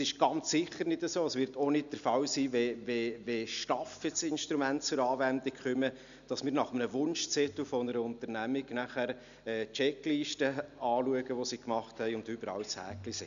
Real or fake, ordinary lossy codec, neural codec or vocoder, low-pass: real; none; none; 7.2 kHz